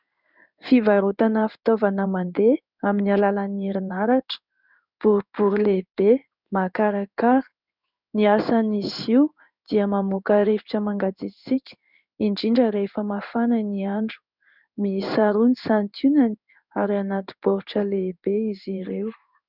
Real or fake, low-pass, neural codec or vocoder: fake; 5.4 kHz; codec, 16 kHz in and 24 kHz out, 1 kbps, XY-Tokenizer